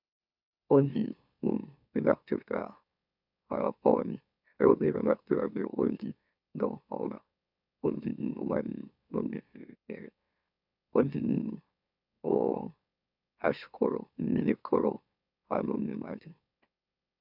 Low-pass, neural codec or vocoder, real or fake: 5.4 kHz; autoencoder, 44.1 kHz, a latent of 192 numbers a frame, MeloTTS; fake